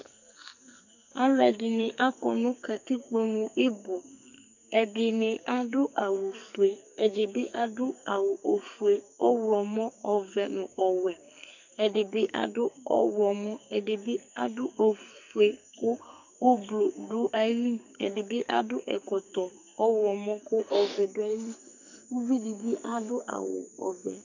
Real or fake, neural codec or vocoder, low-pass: fake; codec, 44.1 kHz, 2.6 kbps, SNAC; 7.2 kHz